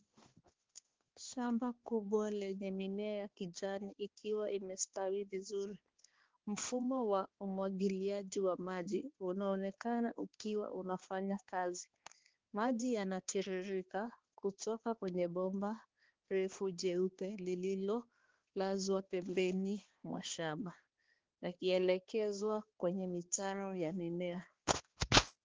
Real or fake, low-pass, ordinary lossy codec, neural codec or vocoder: fake; 7.2 kHz; Opus, 16 kbps; codec, 16 kHz, 2 kbps, X-Codec, HuBERT features, trained on balanced general audio